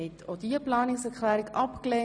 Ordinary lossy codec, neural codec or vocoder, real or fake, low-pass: none; none; real; 9.9 kHz